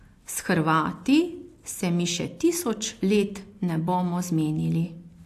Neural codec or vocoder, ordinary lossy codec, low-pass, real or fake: none; AAC, 64 kbps; 14.4 kHz; real